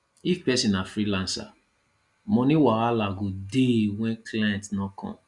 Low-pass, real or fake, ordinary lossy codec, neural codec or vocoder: 10.8 kHz; real; Opus, 64 kbps; none